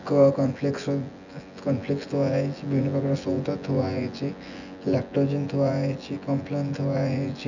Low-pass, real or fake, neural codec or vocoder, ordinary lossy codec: 7.2 kHz; fake; vocoder, 24 kHz, 100 mel bands, Vocos; none